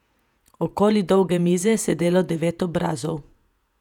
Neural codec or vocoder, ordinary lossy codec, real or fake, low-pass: vocoder, 48 kHz, 128 mel bands, Vocos; none; fake; 19.8 kHz